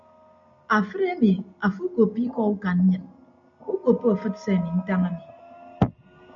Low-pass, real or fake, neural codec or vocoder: 7.2 kHz; real; none